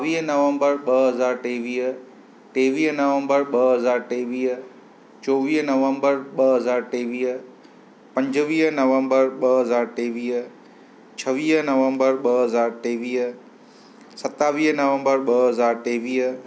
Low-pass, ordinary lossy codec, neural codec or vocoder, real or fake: none; none; none; real